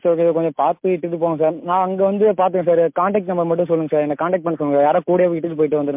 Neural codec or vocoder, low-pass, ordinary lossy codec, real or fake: none; 3.6 kHz; MP3, 32 kbps; real